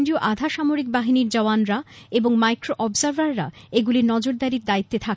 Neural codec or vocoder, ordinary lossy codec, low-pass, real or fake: none; none; none; real